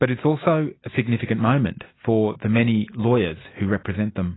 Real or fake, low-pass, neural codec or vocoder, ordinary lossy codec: real; 7.2 kHz; none; AAC, 16 kbps